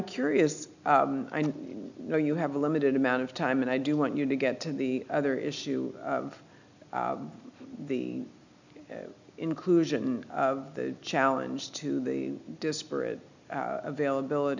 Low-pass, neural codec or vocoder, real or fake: 7.2 kHz; none; real